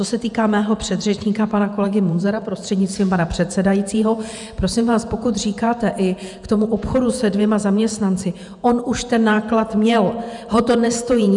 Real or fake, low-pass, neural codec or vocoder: fake; 10.8 kHz; vocoder, 48 kHz, 128 mel bands, Vocos